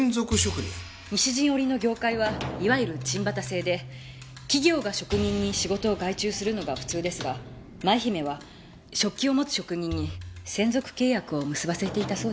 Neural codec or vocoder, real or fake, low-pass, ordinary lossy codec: none; real; none; none